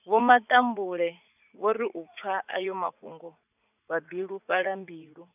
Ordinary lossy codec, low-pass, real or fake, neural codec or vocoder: none; 3.6 kHz; fake; vocoder, 44.1 kHz, 80 mel bands, Vocos